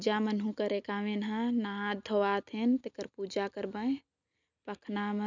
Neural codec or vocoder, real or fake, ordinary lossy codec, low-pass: none; real; none; 7.2 kHz